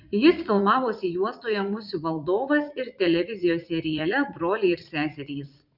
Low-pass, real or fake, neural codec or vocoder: 5.4 kHz; fake; vocoder, 44.1 kHz, 80 mel bands, Vocos